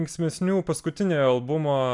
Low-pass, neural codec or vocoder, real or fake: 10.8 kHz; none; real